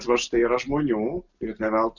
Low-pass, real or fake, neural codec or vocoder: 7.2 kHz; real; none